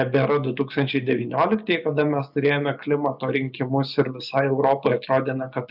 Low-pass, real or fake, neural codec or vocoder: 5.4 kHz; real; none